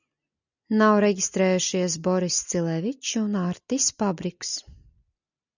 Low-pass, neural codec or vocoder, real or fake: 7.2 kHz; none; real